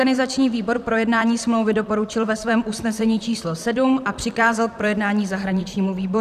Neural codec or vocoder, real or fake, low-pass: vocoder, 44.1 kHz, 128 mel bands, Pupu-Vocoder; fake; 14.4 kHz